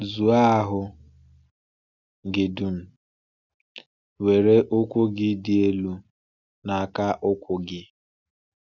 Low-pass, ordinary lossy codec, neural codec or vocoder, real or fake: 7.2 kHz; none; none; real